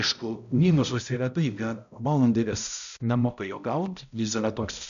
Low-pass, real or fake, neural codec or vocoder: 7.2 kHz; fake; codec, 16 kHz, 0.5 kbps, X-Codec, HuBERT features, trained on balanced general audio